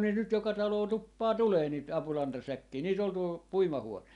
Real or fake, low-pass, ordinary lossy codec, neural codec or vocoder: real; 10.8 kHz; none; none